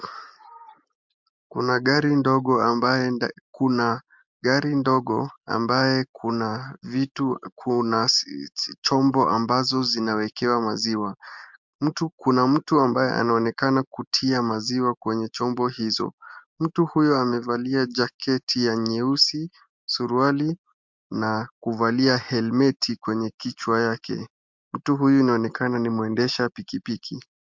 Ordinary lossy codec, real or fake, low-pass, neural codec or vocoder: MP3, 64 kbps; real; 7.2 kHz; none